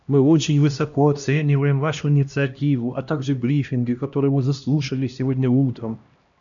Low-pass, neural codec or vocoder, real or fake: 7.2 kHz; codec, 16 kHz, 1 kbps, X-Codec, HuBERT features, trained on LibriSpeech; fake